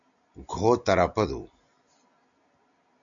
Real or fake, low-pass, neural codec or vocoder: real; 7.2 kHz; none